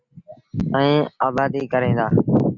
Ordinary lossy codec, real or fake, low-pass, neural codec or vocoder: Opus, 64 kbps; real; 7.2 kHz; none